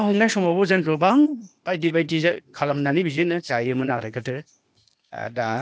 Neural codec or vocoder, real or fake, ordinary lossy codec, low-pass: codec, 16 kHz, 0.8 kbps, ZipCodec; fake; none; none